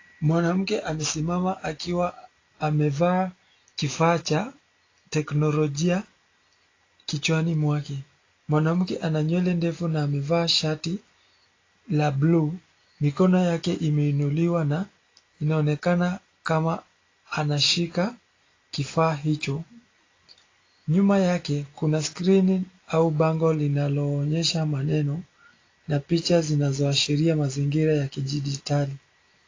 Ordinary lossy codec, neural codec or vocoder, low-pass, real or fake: AAC, 32 kbps; none; 7.2 kHz; real